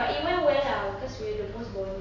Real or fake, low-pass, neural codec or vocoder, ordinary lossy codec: real; 7.2 kHz; none; AAC, 32 kbps